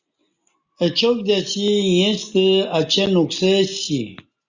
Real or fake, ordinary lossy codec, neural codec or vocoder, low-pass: real; Opus, 64 kbps; none; 7.2 kHz